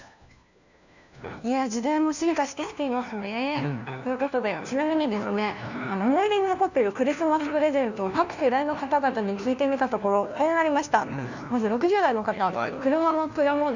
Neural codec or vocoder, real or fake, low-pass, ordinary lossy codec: codec, 16 kHz, 1 kbps, FunCodec, trained on LibriTTS, 50 frames a second; fake; 7.2 kHz; none